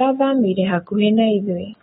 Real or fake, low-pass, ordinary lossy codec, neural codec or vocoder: fake; 19.8 kHz; AAC, 16 kbps; autoencoder, 48 kHz, 128 numbers a frame, DAC-VAE, trained on Japanese speech